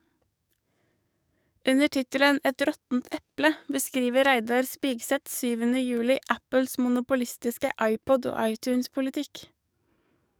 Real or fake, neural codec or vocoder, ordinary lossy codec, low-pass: fake; codec, 44.1 kHz, 7.8 kbps, DAC; none; none